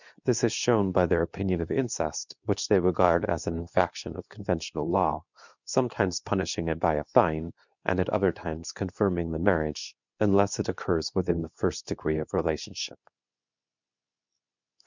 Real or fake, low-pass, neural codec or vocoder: fake; 7.2 kHz; codec, 24 kHz, 0.9 kbps, WavTokenizer, medium speech release version 2